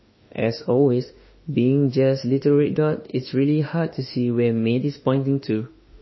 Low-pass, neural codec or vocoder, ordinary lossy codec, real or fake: 7.2 kHz; autoencoder, 48 kHz, 32 numbers a frame, DAC-VAE, trained on Japanese speech; MP3, 24 kbps; fake